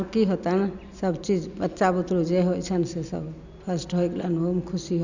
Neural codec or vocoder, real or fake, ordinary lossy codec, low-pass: none; real; none; 7.2 kHz